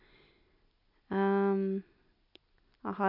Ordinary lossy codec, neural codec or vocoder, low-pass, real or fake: AAC, 48 kbps; none; 5.4 kHz; real